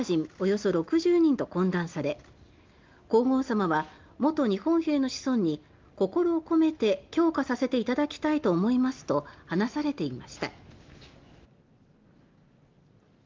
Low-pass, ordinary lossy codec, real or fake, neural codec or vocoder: 7.2 kHz; Opus, 32 kbps; real; none